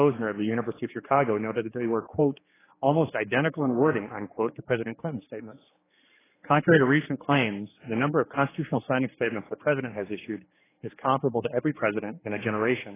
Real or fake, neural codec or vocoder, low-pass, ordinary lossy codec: fake; codec, 16 kHz, 4 kbps, X-Codec, HuBERT features, trained on general audio; 3.6 kHz; AAC, 16 kbps